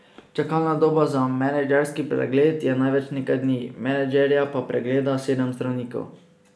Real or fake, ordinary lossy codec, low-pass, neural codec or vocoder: real; none; none; none